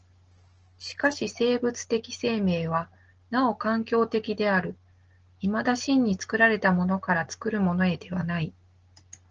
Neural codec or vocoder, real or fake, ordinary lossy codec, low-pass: none; real; Opus, 16 kbps; 7.2 kHz